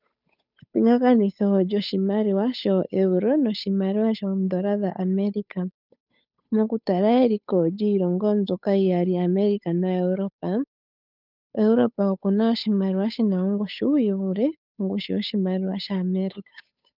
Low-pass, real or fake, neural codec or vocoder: 5.4 kHz; fake; codec, 16 kHz, 8 kbps, FunCodec, trained on Chinese and English, 25 frames a second